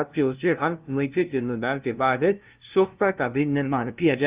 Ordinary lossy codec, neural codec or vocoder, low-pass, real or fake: Opus, 32 kbps; codec, 16 kHz, 0.5 kbps, FunCodec, trained on LibriTTS, 25 frames a second; 3.6 kHz; fake